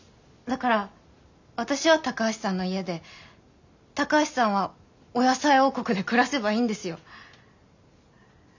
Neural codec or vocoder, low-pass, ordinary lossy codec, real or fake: none; 7.2 kHz; none; real